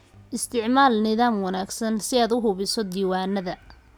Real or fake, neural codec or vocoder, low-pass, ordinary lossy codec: real; none; none; none